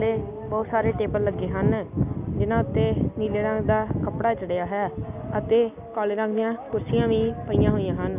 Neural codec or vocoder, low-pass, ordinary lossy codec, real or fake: none; 3.6 kHz; none; real